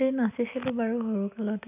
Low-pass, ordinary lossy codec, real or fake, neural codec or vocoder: 3.6 kHz; none; real; none